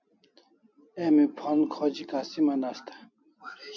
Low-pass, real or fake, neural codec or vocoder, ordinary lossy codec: 7.2 kHz; real; none; MP3, 48 kbps